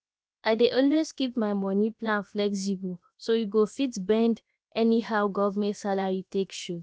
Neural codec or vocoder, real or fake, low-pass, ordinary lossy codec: codec, 16 kHz, 0.7 kbps, FocalCodec; fake; none; none